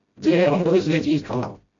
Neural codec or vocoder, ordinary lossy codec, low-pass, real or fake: codec, 16 kHz, 0.5 kbps, FreqCodec, smaller model; AAC, 32 kbps; 7.2 kHz; fake